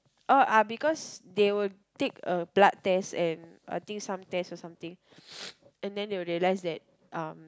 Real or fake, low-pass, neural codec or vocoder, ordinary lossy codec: real; none; none; none